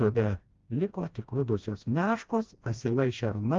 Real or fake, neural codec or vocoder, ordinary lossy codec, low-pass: fake; codec, 16 kHz, 1 kbps, FreqCodec, smaller model; Opus, 32 kbps; 7.2 kHz